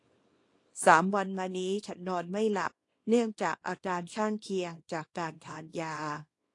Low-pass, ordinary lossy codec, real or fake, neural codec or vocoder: 10.8 kHz; AAC, 48 kbps; fake; codec, 24 kHz, 0.9 kbps, WavTokenizer, small release